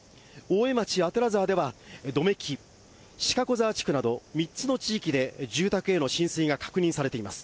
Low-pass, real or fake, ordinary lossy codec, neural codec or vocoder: none; real; none; none